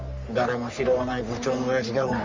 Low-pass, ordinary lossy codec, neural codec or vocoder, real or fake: 7.2 kHz; Opus, 32 kbps; codec, 44.1 kHz, 3.4 kbps, Pupu-Codec; fake